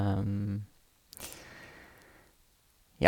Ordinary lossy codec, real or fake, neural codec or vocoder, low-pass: none; real; none; 19.8 kHz